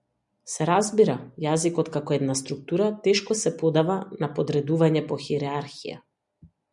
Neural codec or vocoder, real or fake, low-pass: none; real; 10.8 kHz